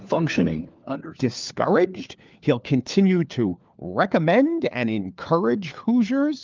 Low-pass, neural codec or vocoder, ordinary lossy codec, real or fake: 7.2 kHz; codec, 16 kHz, 4 kbps, FunCodec, trained on LibriTTS, 50 frames a second; Opus, 32 kbps; fake